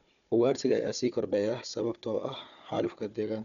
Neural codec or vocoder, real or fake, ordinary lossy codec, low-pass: codec, 16 kHz, 4 kbps, FunCodec, trained on Chinese and English, 50 frames a second; fake; none; 7.2 kHz